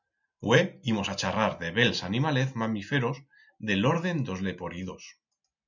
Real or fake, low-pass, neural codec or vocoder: real; 7.2 kHz; none